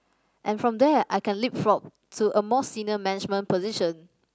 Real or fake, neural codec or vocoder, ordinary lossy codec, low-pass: real; none; none; none